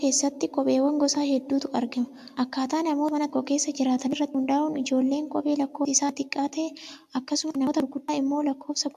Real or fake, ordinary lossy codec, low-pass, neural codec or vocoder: real; AAC, 96 kbps; 14.4 kHz; none